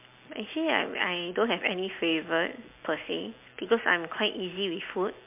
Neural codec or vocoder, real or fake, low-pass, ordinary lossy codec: none; real; 3.6 kHz; MP3, 32 kbps